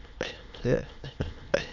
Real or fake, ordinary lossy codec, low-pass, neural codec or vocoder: fake; none; 7.2 kHz; autoencoder, 22.05 kHz, a latent of 192 numbers a frame, VITS, trained on many speakers